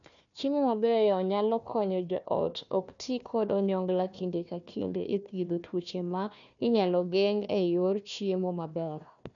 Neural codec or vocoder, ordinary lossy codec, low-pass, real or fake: codec, 16 kHz, 1 kbps, FunCodec, trained on Chinese and English, 50 frames a second; none; 7.2 kHz; fake